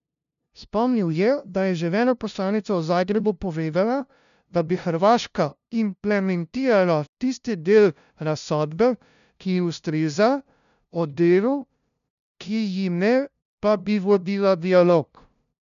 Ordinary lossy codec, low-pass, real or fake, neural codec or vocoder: none; 7.2 kHz; fake; codec, 16 kHz, 0.5 kbps, FunCodec, trained on LibriTTS, 25 frames a second